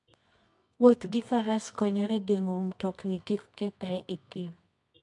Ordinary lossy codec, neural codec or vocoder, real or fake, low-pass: MP3, 64 kbps; codec, 24 kHz, 0.9 kbps, WavTokenizer, medium music audio release; fake; 10.8 kHz